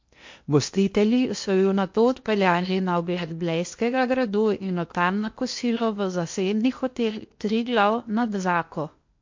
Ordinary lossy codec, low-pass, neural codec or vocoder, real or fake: MP3, 48 kbps; 7.2 kHz; codec, 16 kHz in and 24 kHz out, 0.8 kbps, FocalCodec, streaming, 65536 codes; fake